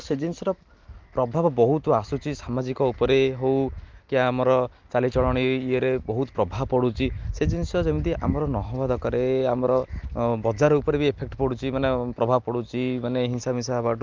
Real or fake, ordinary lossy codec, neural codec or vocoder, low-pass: real; Opus, 32 kbps; none; 7.2 kHz